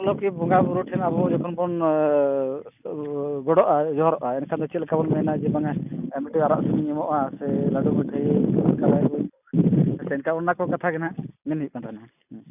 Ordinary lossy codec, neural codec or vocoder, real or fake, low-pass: none; none; real; 3.6 kHz